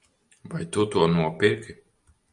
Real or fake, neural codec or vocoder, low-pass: real; none; 10.8 kHz